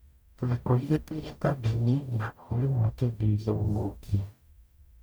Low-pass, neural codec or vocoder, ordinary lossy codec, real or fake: none; codec, 44.1 kHz, 0.9 kbps, DAC; none; fake